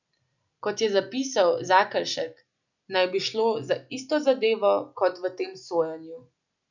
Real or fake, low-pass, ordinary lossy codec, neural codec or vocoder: real; 7.2 kHz; none; none